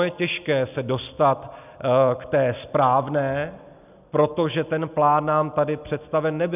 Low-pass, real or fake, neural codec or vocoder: 3.6 kHz; real; none